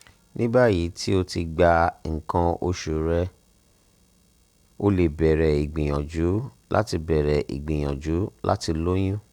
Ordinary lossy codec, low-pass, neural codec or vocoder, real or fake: Opus, 64 kbps; 19.8 kHz; none; real